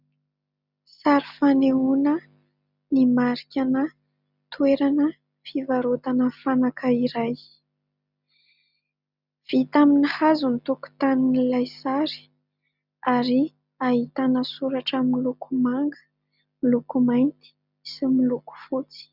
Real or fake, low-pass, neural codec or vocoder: real; 5.4 kHz; none